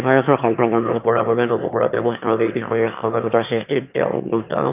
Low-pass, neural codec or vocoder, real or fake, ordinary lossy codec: 3.6 kHz; autoencoder, 22.05 kHz, a latent of 192 numbers a frame, VITS, trained on one speaker; fake; none